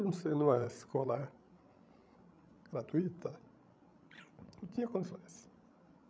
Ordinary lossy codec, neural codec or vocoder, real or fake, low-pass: none; codec, 16 kHz, 16 kbps, FreqCodec, larger model; fake; none